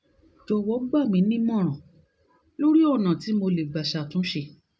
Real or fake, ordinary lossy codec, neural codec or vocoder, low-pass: real; none; none; none